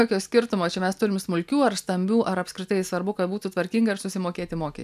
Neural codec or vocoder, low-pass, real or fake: none; 14.4 kHz; real